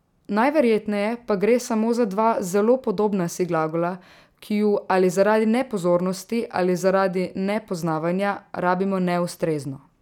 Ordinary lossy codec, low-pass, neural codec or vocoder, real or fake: none; 19.8 kHz; none; real